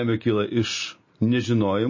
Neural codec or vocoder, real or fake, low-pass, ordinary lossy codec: none; real; 7.2 kHz; MP3, 32 kbps